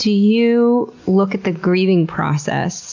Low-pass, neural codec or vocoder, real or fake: 7.2 kHz; none; real